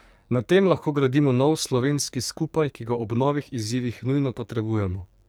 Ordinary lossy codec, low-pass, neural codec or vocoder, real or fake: none; none; codec, 44.1 kHz, 2.6 kbps, SNAC; fake